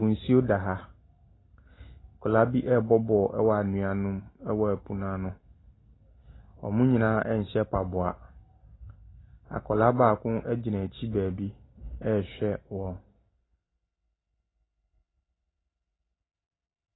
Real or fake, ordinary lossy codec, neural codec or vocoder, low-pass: real; AAC, 16 kbps; none; 7.2 kHz